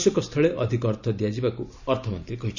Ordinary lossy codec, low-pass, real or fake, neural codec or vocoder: none; 7.2 kHz; real; none